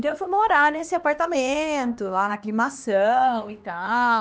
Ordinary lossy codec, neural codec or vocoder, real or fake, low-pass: none; codec, 16 kHz, 2 kbps, X-Codec, HuBERT features, trained on LibriSpeech; fake; none